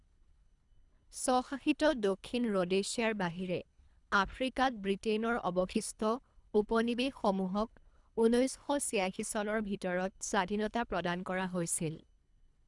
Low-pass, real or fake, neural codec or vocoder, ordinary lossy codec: 10.8 kHz; fake; codec, 24 kHz, 3 kbps, HILCodec; none